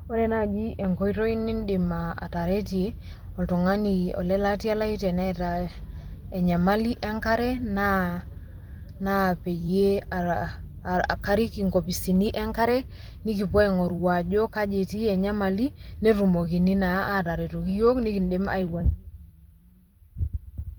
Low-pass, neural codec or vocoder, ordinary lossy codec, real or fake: 19.8 kHz; vocoder, 44.1 kHz, 128 mel bands every 256 samples, BigVGAN v2; Opus, 24 kbps; fake